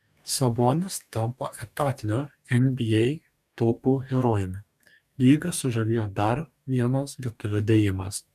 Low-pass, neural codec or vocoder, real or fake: 14.4 kHz; codec, 44.1 kHz, 2.6 kbps, DAC; fake